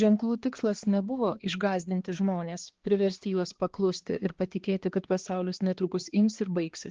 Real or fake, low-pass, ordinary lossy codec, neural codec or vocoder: fake; 7.2 kHz; Opus, 16 kbps; codec, 16 kHz, 2 kbps, X-Codec, HuBERT features, trained on balanced general audio